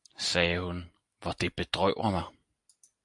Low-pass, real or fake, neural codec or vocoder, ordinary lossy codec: 10.8 kHz; real; none; AAC, 64 kbps